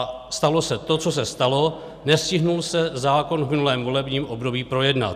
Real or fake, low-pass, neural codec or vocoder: real; 14.4 kHz; none